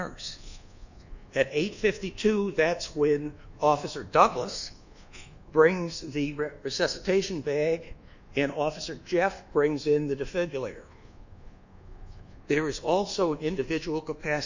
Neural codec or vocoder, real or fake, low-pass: codec, 24 kHz, 1.2 kbps, DualCodec; fake; 7.2 kHz